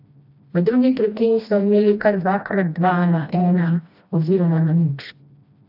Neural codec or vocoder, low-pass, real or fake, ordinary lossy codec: codec, 16 kHz, 1 kbps, FreqCodec, smaller model; 5.4 kHz; fake; AAC, 48 kbps